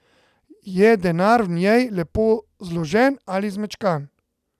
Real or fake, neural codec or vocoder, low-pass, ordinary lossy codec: real; none; 14.4 kHz; none